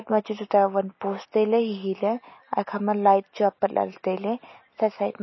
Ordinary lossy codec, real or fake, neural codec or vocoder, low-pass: MP3, 24 kbps; real; none; 7.2 kHz